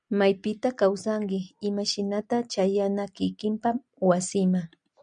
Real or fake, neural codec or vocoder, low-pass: real; none; 9.9 kHz